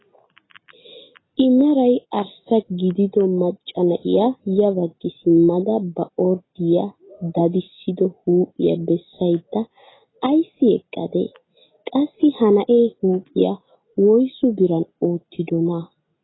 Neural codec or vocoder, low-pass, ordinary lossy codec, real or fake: none; 7.2 kHz; AAC, 16 kbps; real